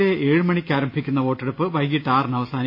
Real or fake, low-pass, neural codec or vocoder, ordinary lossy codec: real; 5.4 kHz; none; MP3, 32 kbps